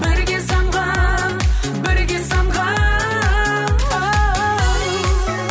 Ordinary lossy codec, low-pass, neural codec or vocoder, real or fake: none; none; none; real